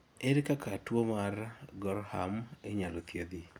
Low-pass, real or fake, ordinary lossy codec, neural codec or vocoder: none; real; none; none